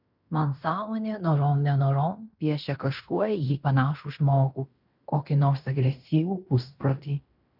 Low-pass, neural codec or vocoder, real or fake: 5.4 kHz; codec, 16 kHz in and 24 kHz out, 0.9 kbps, LongCat-Audio-Codec, fine tuned four codebook decoder; fake